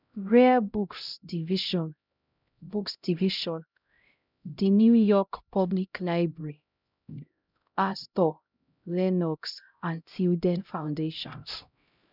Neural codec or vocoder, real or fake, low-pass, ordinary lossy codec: codec, 16 kHz, 0.5 kbps, X-Codec, HuBERT features, trained on LibriSpeech; fake; 5.4 kHz; none